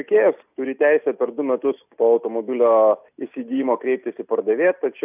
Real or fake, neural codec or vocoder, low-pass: real; none; 3.6 kHz